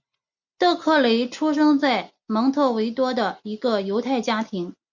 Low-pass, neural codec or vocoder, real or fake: 7.2 kHz; none; real